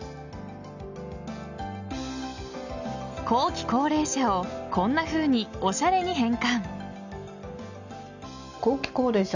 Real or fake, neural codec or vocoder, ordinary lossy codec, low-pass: real; none; none; 7.2 kHz